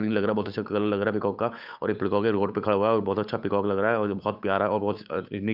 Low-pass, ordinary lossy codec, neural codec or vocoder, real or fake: 5.4 kHz; none; codec, 16 kHz, 8 kbps, FunCodec, trained on Chinese and English, 25 frames a second; fake